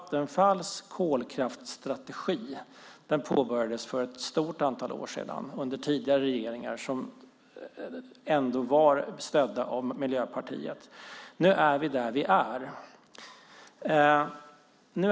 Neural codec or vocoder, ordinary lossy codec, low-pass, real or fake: none; none; none; real